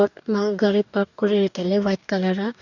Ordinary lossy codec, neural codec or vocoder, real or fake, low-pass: none; codec, 44.1 kHz, 2.6 kbps, DAC; fake; 7.2 kHz